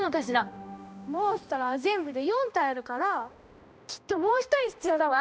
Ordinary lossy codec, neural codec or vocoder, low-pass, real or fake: none; codec, 16 kHz, 1 kbps, X-Codec, HuBERT features, trained on balanced general audio; none; fake